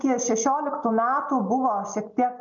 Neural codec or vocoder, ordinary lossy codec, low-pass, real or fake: none; MP3, 64 kbps; 7.2 kHz; real